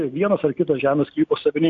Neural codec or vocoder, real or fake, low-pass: none; real; 7.2 kHz